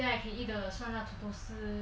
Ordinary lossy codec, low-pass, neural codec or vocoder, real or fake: none; none; none; real